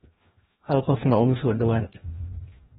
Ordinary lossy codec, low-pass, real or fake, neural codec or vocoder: AAC, 16 kbps; 7.2 kHz; fake; codec, 16 kHz, 1 kbps, FreqCodec, larger model